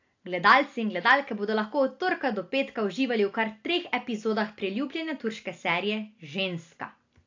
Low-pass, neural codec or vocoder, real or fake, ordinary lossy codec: 7.2 kHz; none; real; AAC, 48 kbps